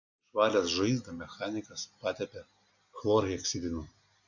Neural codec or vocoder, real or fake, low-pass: none; real; 7.2 kHz